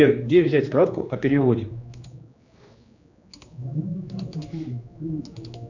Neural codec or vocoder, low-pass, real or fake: codec, 16 kHz, 2 kbps, X-Codec, HuBERT features, trained on general audio; 7.2 kHz; fake